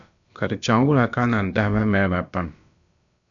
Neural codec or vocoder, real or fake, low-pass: codec, 16 kHz, about 1 kbps, DyCAST, with the encoder's durations; fake; 7.2 kHz